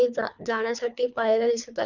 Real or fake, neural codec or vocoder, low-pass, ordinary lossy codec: fake; codec, 24 kHz, 3 kbps, HILCodec; 7.2 kHz; none